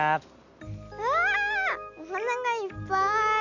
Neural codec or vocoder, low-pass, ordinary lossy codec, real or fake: none; 7.2 kHz; none; real